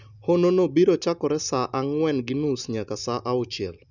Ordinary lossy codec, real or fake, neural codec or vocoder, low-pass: none; real; none; 7.2 kHz